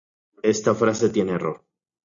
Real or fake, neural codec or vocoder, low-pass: real; none; 7.2 kHz